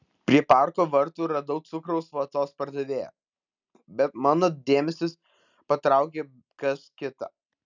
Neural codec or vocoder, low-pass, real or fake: none; 7.2 kHz; real